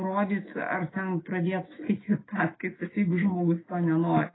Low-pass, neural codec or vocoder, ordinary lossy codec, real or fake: 7.2 kHz; autoencoder, 48 kHz, 128 numbers a frame, DAC-VAE, trained on Japanese speech; AAC, 16 kbps; fake